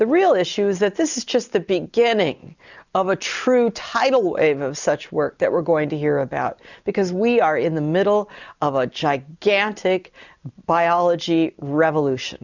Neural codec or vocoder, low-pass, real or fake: none; 7.2 kHz; real